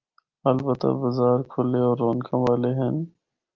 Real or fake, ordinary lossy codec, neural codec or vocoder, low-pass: real; Opus, 32 kbps; none; 7.2 kHz